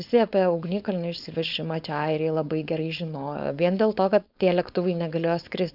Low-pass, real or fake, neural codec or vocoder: 5.4 kHz; fake; codec, 16 kHz, 4.8 kbps, FACodec